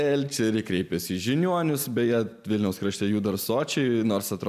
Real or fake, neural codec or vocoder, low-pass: real; none; 14.4 kHz